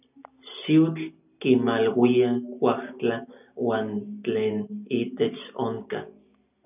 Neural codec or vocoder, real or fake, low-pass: none; real; 3.6 kHz